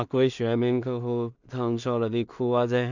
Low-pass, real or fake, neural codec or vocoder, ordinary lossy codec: 7.2 kHz; fake; codec, 16 kHz in and 24 kHz out, 0.4 kbps, LongCat-Audio-Codec, two codebook decoder; none